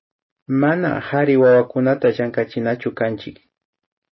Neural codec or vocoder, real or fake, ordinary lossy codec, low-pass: none; real; MP3, 24 kbps; 7.2 kHz